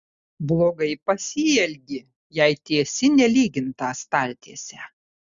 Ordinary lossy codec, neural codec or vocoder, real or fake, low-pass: Opus, 64 kbps; none; real; 7.2 kHz